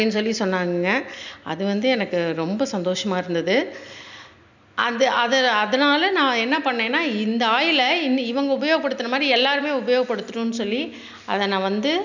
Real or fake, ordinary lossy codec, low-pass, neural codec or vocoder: real; none; 7.2 kHz; none